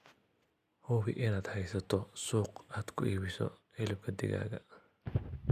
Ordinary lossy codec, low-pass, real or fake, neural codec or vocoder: none; 14.4 kHz; real; none